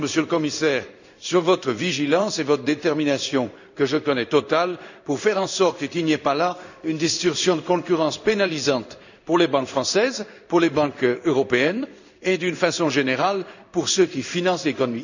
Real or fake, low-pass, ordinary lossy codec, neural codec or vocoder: fake; 7.2 kHz; none; codec, 16 kHz in and 24 kHz out, 1 kbps, XY-Tokenizer